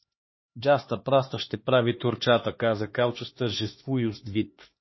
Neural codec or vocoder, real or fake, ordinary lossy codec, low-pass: codec, 16 kHz, 2 kbps, X-Codec, HuBERT features, trained on LibriSpeech; fake; MP3, 24 kbps; 7.2 kHz